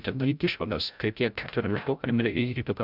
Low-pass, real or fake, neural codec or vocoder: 5.4 kHz; fake; codec, 16 kHz, 0.5 kbps, FreqCodec, larger model